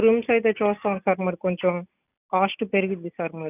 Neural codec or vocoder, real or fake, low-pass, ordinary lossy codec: none; real; 3.6 kHz; none